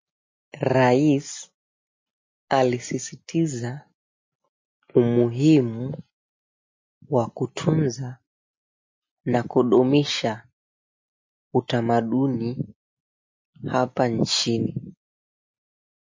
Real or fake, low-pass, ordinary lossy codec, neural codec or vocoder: real; 7.2 kHz; MP3, 32 kbps; none